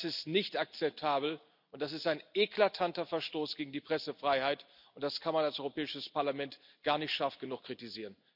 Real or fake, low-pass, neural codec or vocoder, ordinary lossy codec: real; 5.4 kHz; none; none